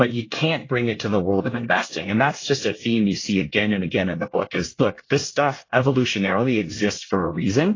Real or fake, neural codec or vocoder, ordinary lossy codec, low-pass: fake; codec, 24 kHz, 1 kbps, SNAC; AAC, 32 kbps; 7.2 kHz